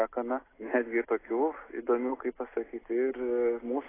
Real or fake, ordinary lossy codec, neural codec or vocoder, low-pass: real; AAC, 16 kbps; none; 3.6 kHz